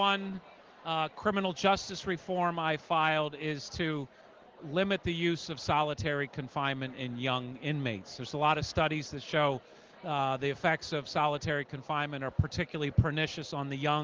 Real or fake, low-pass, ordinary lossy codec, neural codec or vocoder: real; 7.2 kHz; Opus, 16 kbps; none